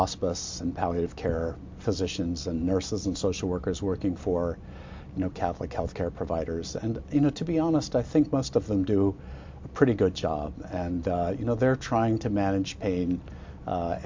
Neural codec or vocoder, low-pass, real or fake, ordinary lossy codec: none; 7.2 kHz; real; MP3, 48 kbps